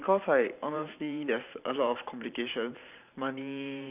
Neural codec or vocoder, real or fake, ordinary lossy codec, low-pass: vocoder, 44.1 kHz, 128 mel bands every 512 samples, BigVGAN v2; fake; none; 3.6 kHz